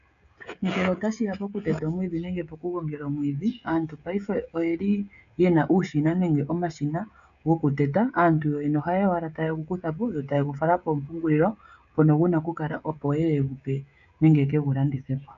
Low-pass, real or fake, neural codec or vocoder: 7.2 kHz; fake; codec, 16 kHz, 16 kbps, FreqCodec, smaller model